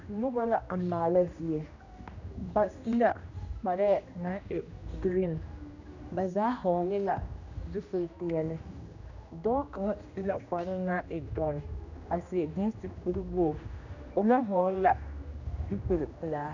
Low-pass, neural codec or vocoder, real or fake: 7.2 kHz; codec, 16 kHz, 1 kbps, X-Codec, HuBERT features, trained on general audio; fake